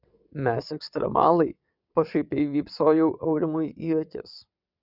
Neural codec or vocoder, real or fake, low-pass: vocoder, 22.05 kHz, 80 mel bands, WaveNeXt; fake; 5.4 kHz